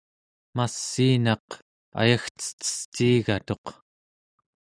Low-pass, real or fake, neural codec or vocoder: 9.9 kHz; real; none